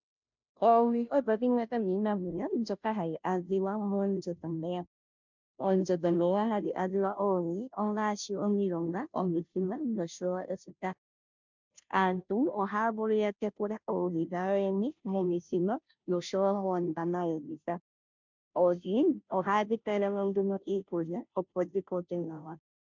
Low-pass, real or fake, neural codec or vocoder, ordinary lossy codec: 7.2 kHz; fake; codec, 16 kHz, 0.5 kbps, FunCodec, trained on Chinese and English, 25 frames a second; MP3, 64 kbps